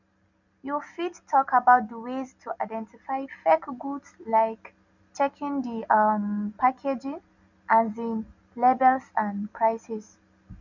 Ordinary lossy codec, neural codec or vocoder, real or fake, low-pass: none; none; real; 7.2 kHz